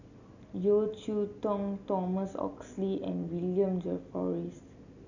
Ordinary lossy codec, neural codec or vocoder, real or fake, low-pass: none; none; real; 7.2 kHz